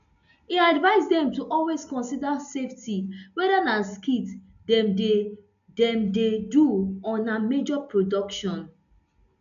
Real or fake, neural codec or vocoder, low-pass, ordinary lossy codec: real; none; 7.2 kHz; none